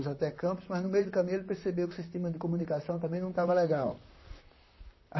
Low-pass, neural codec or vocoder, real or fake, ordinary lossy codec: 7.2 kHz; vocoder, 44.1 kHz, 128 mel bands, Pupu-Vocoder; fake; MP3, 24 kbps